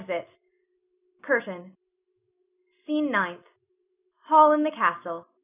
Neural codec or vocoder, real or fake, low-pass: none; real; 3.6 kHz